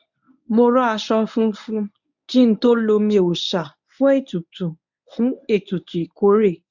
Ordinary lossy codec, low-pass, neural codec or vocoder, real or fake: none; 7.2 kHz; codec, 24 kHz, 0.9 kbps, WavTokenizer, medium speech release version 1; fake